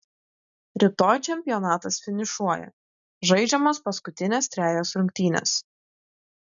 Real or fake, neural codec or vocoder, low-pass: real; none; 7.2 kHz